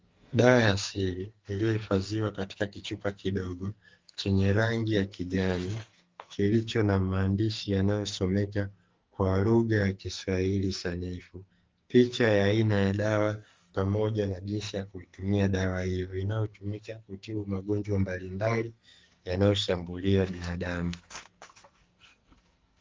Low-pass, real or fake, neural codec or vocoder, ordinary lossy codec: 7.2 kHz; fake; codec, 44.1 kHz, 2.6 kbps, SNAC; Opus, 32 kbps